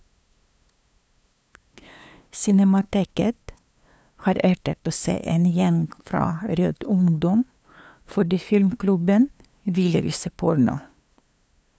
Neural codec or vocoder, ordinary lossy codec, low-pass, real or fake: codec, 16 kHz, 2 kbps, FunCodec, trained on LibriTTS, 25 frames a second; none; none; fake